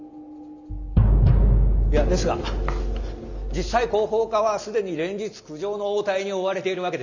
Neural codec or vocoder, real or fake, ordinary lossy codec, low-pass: none; real; none; 7.2 kHz